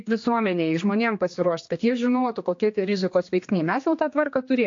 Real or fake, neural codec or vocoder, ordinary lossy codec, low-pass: fake; codec, 16 kHz, 2 kbps, X-Codec, HuBERT features, trained on general audio; AAC, 48 kbps; 7.2 kHz